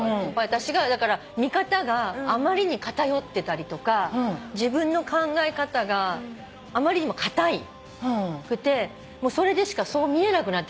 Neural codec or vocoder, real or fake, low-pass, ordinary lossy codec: none; real; none; none